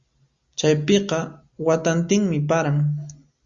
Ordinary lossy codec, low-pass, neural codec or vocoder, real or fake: Opus, 64 kbps; 7.2 kHz; none; real